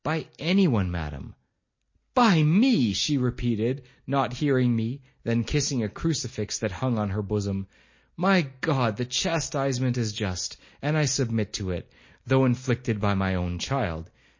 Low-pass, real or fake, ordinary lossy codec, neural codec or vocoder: 7.2 kHz; real; MP3, 32 kbps; none